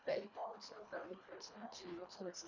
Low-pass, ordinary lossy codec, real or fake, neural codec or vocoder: 7.2 kHz; none; fake; codec, 24 kHz, 1.5 kbps, HILCodec